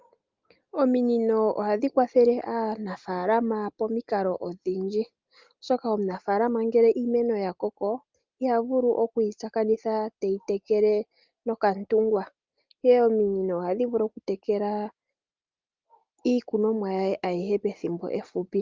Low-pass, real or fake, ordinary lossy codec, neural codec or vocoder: 7.2 kHz; real; Opus, 32 kbps; none